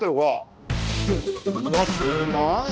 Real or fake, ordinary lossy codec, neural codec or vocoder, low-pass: fake; none; codec, 16 kHz, 1 kbps, X-Codec, HuBERT features, trained on balanced general audio; none